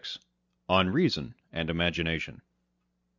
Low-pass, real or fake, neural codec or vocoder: 7.2 kHz; real; none